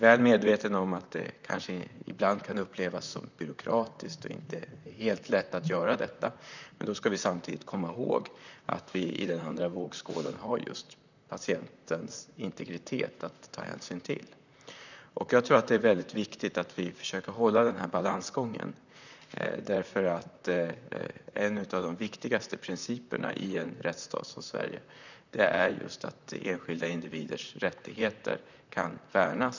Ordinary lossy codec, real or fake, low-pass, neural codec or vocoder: none; fake; 7.2 kHz; vocoder, 44.1 kHz, 128 mel bands, Pupu-Vocoder